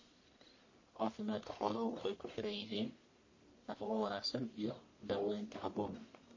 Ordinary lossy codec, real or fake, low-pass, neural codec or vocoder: MP3, 32 kbps; fake; 7.2 kHz; codec, 44.1 kHz, 1.7 kbps, Pupu-Codec